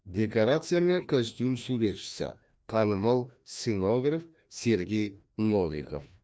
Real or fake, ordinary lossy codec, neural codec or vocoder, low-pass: fake; none; codec, 16 kHz, 1 kbps, FreqCodec, larger model; none